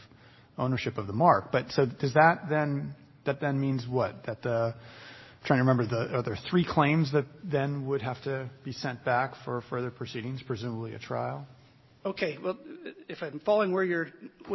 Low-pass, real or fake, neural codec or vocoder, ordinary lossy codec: 7.2 kHz; real; none; MP3, 24 kbps